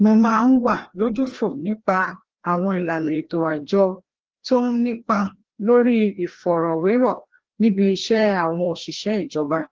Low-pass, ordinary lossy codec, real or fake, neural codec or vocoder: 7.2 kHz; Opus, 16 kbps; fake; codec, 16 kHz, 1 kbps, FreqCodec, larger model